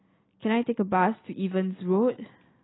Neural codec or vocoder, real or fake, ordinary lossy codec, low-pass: none; real; AAC, 16 kbps; 7.2 kHz